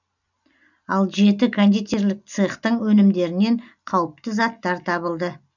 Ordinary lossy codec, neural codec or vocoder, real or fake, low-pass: none; none; real; 7.2 kHz